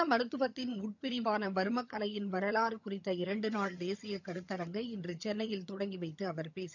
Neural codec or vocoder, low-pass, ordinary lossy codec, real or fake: vocoder, 22.05 kHz, 80 mel bands, HiFi-GAN; 7.2 kHz; none; fake